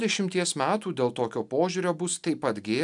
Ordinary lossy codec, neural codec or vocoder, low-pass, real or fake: MP3, 96 kbps; none; 10.8 kHz; real